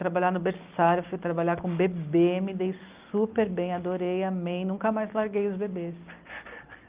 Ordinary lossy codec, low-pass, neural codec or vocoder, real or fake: Opus, 24 kbps; 3.6 kHz; none; real